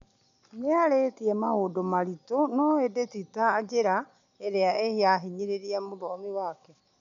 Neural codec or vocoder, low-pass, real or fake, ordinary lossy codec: none; 7.2 kHz; real; none